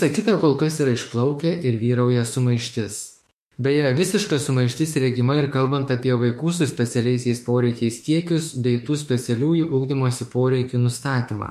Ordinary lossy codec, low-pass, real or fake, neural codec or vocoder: MP3, 64 kbps; 14.4 kHz; fake; autoencoder, 48 kHz, 32 numbers a frame, DAC-VAE, trained on Japanese speech